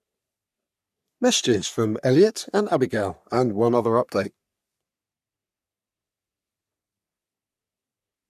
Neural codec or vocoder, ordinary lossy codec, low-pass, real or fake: codec, 44.1 kHz, 3.4 kbps, Pupu-Codec; none; 14.4 kHz; fake